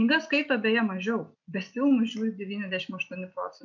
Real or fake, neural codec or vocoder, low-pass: real; none; 7.2 kHz